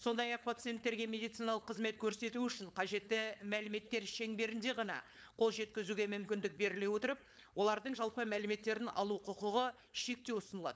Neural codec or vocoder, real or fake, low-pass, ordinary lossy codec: codec, 16 kHz, 4.8 kbps, FACodec; fake; none; none